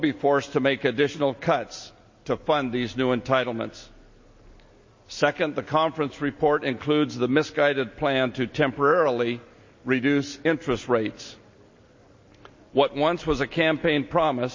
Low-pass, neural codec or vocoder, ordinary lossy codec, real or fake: 7.2 kHz; none; MP3, 32 kbps; real